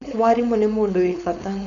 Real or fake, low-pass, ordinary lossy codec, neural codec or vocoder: fake; 7.2 kHz; none; codec, 16 kHz, 4.8 kbps, FACodec